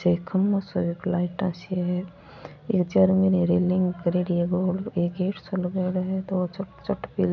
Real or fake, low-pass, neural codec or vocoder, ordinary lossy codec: real; 7.2 kHz; none; none